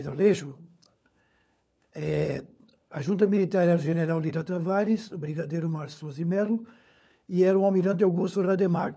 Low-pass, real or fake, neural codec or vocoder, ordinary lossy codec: none; fake; codec, 16 kHz, 4 kbps, FunCodec, trained on LibriTTS, 50 frames a second; none